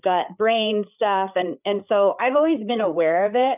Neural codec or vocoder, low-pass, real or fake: codec, 16 kHz, 4 kbps, FreqCodec, larger model; 3.6 kHz; fake